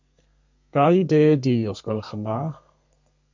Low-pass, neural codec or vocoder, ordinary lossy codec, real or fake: 7.2 kHz; codec, 32 kHz, 1.9 kbps, SNAC; MP3, 64 kbps; fake